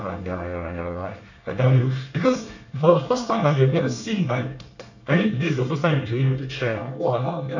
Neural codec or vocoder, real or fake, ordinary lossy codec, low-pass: codec, 24 kHz, 1 kbps, SNAC; fake; none; 7.2 kHz